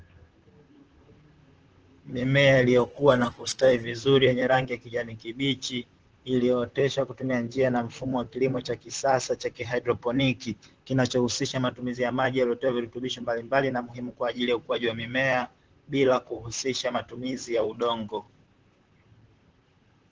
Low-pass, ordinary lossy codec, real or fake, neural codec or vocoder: 7.2 kHz; Opus, 16 kbps; fake; vocoder, 44.1 kHz, 128 mel bands, Pupu-Vocoder